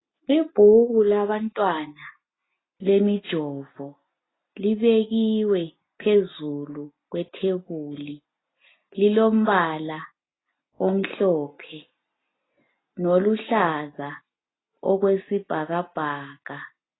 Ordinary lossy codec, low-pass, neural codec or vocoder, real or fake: AAC, 16 kbps; 7.2 kHz; none; real